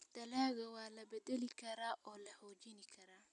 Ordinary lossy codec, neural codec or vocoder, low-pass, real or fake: none; none; 10.8 kHz; real